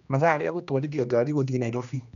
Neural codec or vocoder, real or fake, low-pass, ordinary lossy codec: codec, 16 kHz, 1 kbps, X-Codec, HuBERT features, trained on general audio; fake; 7.2 kHz; none